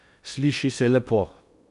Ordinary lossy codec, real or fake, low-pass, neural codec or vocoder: none; fake; 10.8 kHz; codec, 16 kHz in and 24 kHz out, 0.6 kbps, FocalCodec, streaming, 2048 codes